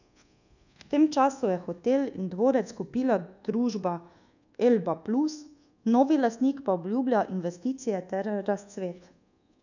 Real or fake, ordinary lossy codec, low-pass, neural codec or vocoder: fake; none; 7.2 kHz; codec, 24 kHz, 1.2 kbps, DualCodec